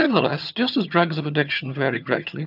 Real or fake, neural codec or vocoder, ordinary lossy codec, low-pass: fake; vocoder, 22.05 kHz, 80 mel bands, HiFi-GAN; AAC, 48 kbps; 5.4 kHz